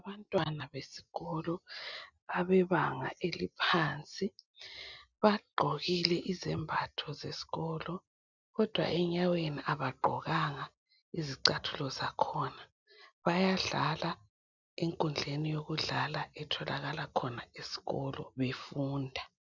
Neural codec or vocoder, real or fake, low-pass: none; real; 7.2 kHz